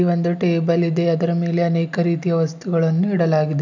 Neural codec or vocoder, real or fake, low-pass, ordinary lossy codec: none; real; 7.2 kHz; none